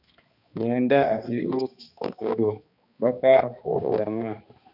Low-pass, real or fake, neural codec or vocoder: 5.4 kHz; fake; codec, 16 kHz, 2 kbps, X-Codec, HuBERT features, trained on balanced general audio